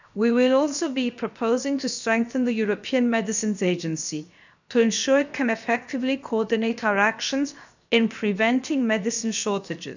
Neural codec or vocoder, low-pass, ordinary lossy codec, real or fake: codec, 16 kHz, 0.7 kbps, FocalCodec; 7.2 kHz; none; fake